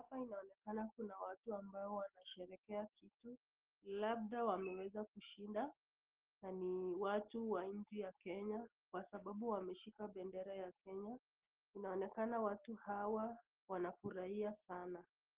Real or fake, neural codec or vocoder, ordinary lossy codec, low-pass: real; none; Opus, 16 kbps; 3.6 kHz